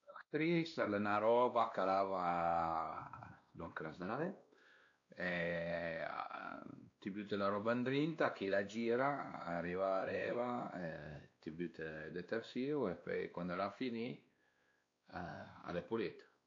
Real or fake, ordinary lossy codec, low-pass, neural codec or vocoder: fake; AAC, 48 kbps; 7.2 kHz; codec, 16 kHz, 2 kbps, X-Codec, WavLM features, trained on Multilingual LibriSpeech